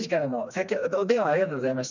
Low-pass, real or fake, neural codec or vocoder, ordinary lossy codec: 7.2 kHz; fake; codec, 16 kHz, 2 kbps, FreqCodec, smaller model; none